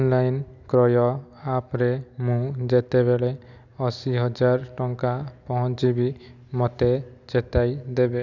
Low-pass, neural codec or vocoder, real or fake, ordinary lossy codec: 7.2 kHz; none; real; none